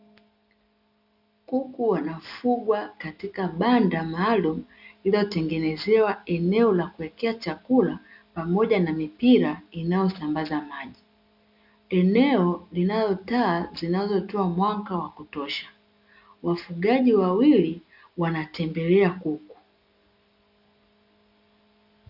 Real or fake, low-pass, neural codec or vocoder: real; 5.4 kHz; none